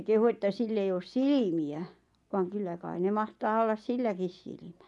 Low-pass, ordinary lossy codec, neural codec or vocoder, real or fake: none; none; vocoder, 24 kHz, 100 mel bands, Vocos; fake